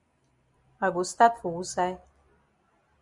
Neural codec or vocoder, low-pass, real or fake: none; 10.8 kHz; real